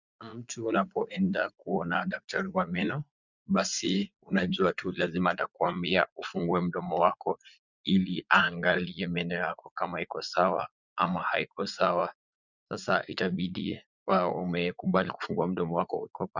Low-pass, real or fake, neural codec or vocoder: 7.2 kHz; fake; vocoder, 44.1 kHz, 80 mel bands, Vocos